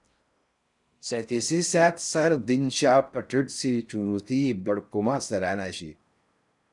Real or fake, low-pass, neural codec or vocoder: fake; 10.8 kHz; codec, 16 kHz in and 24 kHz out, 0.6 kbps, FocalCodec, streaming, 4096 codes